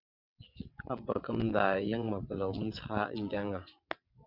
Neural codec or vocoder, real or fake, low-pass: vocoder, 22.05 kHz, 80 mel bands, WaveNeXt; fake; 5.4 kHz